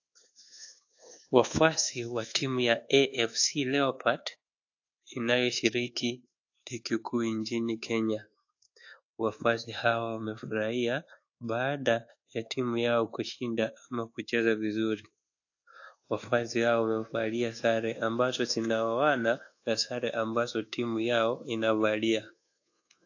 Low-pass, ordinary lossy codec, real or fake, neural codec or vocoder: 7.2 kHz; AAC, 48 kbps; fake; codec, 24 kHz, 1.2 kbps, DualCodec